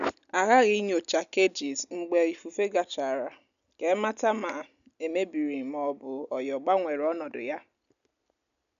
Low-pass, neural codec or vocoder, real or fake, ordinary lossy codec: 7.2 kHz; none; real; none